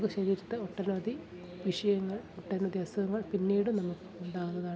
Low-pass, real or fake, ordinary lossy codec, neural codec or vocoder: none; real; none; none